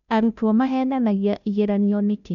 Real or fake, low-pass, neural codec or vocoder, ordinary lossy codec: fake; 7.2 kHz; codec, 16 kHz, 0.5 kbps, FunCodec, trained on Chinese and English, 25 frames a second; none